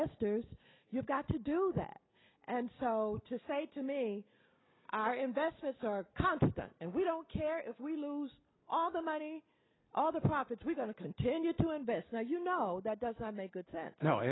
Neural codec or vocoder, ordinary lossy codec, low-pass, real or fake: none; AAC, 16 kbps; 7.2 kHz; real